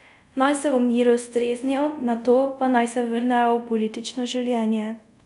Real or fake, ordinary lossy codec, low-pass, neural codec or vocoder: fake; none; 10.8 kHz; codec, 24 kHz, 0.5 kbps, DualCodec